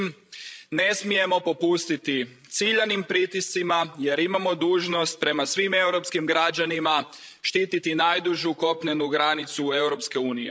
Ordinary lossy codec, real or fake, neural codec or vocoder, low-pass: none; fake; codec, 16 kHz, 16 kbps, FreqCodec, larger model; none